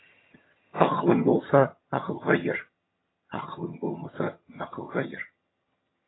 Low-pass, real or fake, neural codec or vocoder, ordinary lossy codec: 7.2 kHz; fake; vocoder, 22.05 kHz, 80 mel bands, HiFi-GAN; AAC, 16 kbps